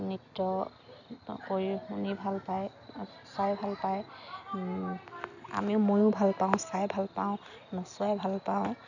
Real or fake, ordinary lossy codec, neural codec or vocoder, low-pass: real; none; none; 7.2 kHz